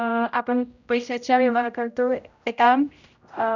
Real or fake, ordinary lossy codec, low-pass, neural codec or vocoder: fake; none; 7.2 kHz; codec, 16 kHz, 0.5 kbps, X-Codec, HuBERT features, trained on general audio